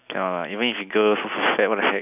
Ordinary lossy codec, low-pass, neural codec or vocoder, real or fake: none; 3.6 kHz; none; real